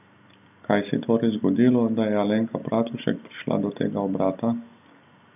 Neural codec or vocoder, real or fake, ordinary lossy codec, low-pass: none; real; none; 3.6 kHz